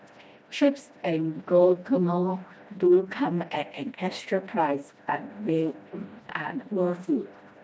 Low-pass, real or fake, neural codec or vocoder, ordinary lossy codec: none; fake; codec, 16 kHz, 1 kbps, FreqCodec, smaller model; none